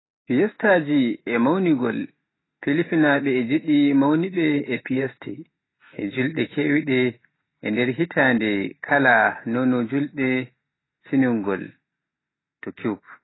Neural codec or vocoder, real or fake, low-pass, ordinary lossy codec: none; real; 7.2 kHz; AAC, 16 kbps